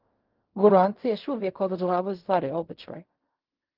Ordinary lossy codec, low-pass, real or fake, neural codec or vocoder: Opus, 32 kbps; 5.4 kHz; fake; codec, 16 kHz in and 24 kHz out, 0.4 kbps, LongCat-Audio-Codec, fine tuned four codebook decoder